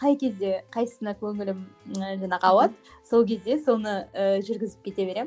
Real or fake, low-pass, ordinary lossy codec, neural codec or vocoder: real; none; none; none